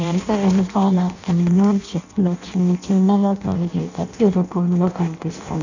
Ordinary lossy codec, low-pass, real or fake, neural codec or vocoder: none; 7.2 kHz; fake; codec, 16 kHz in and 24 kHz out, 0.6 kbps, FireRedTTS-2 codec